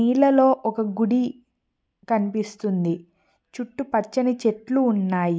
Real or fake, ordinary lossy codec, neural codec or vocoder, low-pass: real; none; none; none